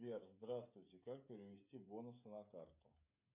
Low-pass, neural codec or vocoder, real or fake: 3.6 kHz; codec, 16 kHz, 16 kbps, FreqCodec, smaller model; fake